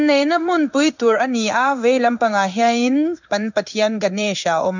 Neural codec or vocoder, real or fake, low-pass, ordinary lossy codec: codec, 16 kHz in and 24 kHz out, 1 kbps, XY-Tokenizer; fake; 7.2 kHz; none